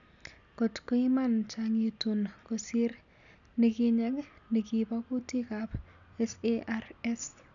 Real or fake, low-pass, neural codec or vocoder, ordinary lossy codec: real; 7.2 kHz; none; none